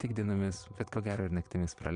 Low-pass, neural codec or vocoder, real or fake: 9.9 kHz; vocoder, 22.05 kHz, 80 mel bands, Vocos; fake